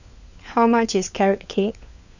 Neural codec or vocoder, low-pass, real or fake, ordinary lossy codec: codec, 16 kHz, 4 kbps, FunCodec, trained on LibriTTS, 50 frames a second; 7.2 kHz; fake; none